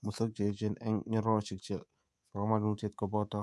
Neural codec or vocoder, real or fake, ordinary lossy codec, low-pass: codec, 24 kHz, 3.1 kbps, DualCodec; fake; none; none